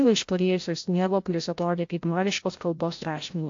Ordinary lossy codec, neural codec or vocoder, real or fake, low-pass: AAC, 48 kbps; codec, 16 kHz, 0.5 kbps, FreqCodec, larger model; fake; 7.2 kHz